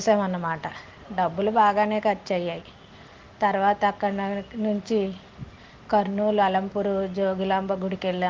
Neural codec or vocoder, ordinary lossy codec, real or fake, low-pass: none; Opus, 16 kbps; real; 7.2 kHz